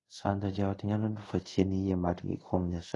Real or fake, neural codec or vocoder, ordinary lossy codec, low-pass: fake; codec, 24 kHz, 0.5 kbps, DualCodec; AAC, 32 kbps; 10.8 kHz